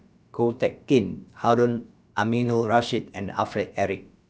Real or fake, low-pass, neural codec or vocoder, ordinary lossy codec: fake; none; codec, 16 kHz, about 1 kbps, DyCAST, with the encoder's durations; none